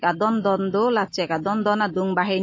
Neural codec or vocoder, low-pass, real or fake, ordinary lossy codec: none; 7.2 kHz; real; MP3, 32 kbps